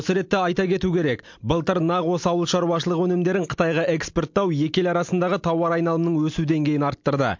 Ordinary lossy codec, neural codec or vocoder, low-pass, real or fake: MP3, 48 kbps; none; 7.2 kHz; real